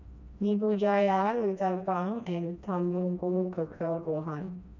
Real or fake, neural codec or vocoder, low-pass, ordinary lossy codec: fake; codec, 16 kHz, 1 kbps, FreqCodec, smaller model; 7.2 kHz; none